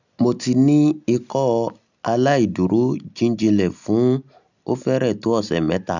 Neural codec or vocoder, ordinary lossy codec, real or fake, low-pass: none; none; real; 7.2 kHz